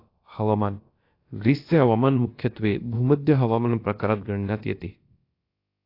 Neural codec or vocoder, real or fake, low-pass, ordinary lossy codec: codec, 16 kHz, about 1 kbps, DyCAST, with the encoder's durations; fake; 5.4 kHz; AAC, 32 kbps